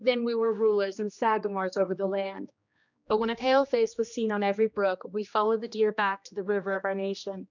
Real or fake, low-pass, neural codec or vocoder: fake; 7.2 kHz; codec, 16 kHz, 2 kbps, X-Codec, HuBERT features, trained on general audio